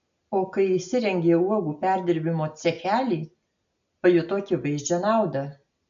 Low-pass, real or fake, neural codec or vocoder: 7.2 kHz; real; none